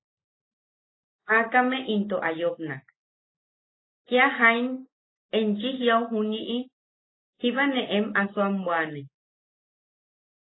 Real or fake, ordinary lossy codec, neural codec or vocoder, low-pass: real; AAC, 16 kbps; none; 7.2 kHz